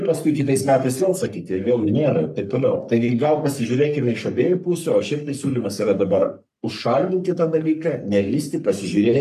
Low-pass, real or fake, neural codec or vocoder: 14.4 kHz; fake; codec, 44.1 kHz, 3.4 kbps, Pupu-Codec